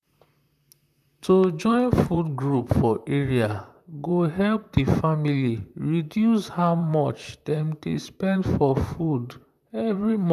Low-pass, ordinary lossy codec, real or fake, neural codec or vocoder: 14.4 kHz; none; fake; vocoder, 44.1 kHz, 128 mel bands, Pupu-Vocoder